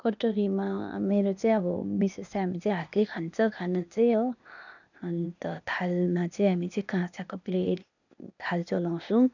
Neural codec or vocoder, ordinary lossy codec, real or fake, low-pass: codec, 16 kHz, 0.8 kbps, ZipCodec; none; fake; 7.2 kHz